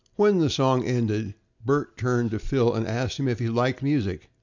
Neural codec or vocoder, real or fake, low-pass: none; real; 7.2 kHz